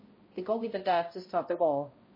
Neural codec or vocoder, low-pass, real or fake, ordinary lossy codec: codec, 16 kHz, 0.5 kbps, X-Codec, HuBERT features, trained on balanced general audio; 5.4 kHz; fake; MP3, 24 kbps